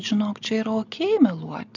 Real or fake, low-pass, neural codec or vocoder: real; 7.2 kHz; none